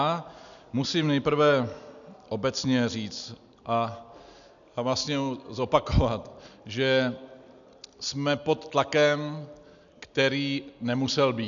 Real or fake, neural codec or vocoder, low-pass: real; none; 7.2 kHz